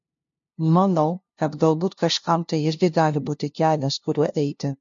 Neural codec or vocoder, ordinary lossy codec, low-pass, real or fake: codec, 16 kHz, 0.5 kbps, FunCodec, trained on LibriTTS, 25 frames a second; MP3, 48 kbps; 7.2 kHz; fake